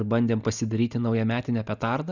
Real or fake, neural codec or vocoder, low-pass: real; none; 7.2 kHz